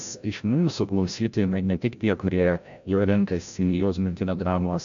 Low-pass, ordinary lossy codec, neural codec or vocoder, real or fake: 7.2 kHz; MP3, 64 kbps; codec, 16 kHz, 0.5 kbps, FreqCodec, larger model; fake